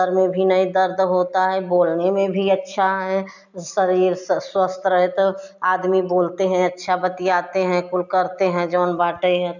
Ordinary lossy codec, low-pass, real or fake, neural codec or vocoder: none; 7.2 kHz; real; none